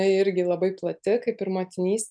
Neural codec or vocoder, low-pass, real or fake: none; 9.9 kHz; real